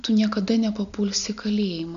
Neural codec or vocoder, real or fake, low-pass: none; real; 7.2 kHz